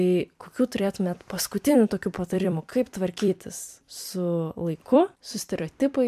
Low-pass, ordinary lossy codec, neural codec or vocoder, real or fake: 14.4 kHz; MP3, 96 kbps; vocoder, 44.1 kHz, 128 mel bands every 256 samples, BigVGAN v2; fake